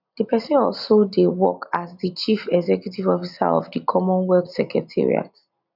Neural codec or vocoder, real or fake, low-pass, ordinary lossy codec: none; real; 5.4 kHz; none